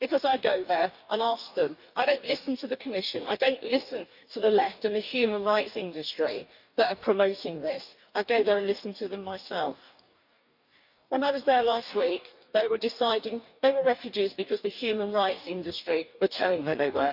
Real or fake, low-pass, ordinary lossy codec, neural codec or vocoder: fake; 5.4 kHz; none; codec, 44.1 kHz, 2.6 kbps, DAC